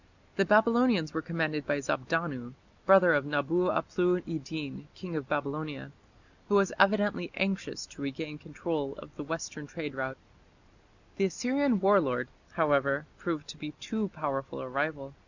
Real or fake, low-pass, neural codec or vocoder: real; 7.2 kHz; none